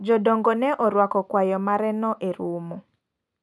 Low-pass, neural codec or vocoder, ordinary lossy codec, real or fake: none; none; none; real